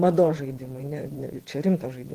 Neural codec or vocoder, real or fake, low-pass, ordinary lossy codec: vocoder, 48 kHz, 128 mel bands, Vocos; fake; 14.4 kHz; Opus, 16 kbps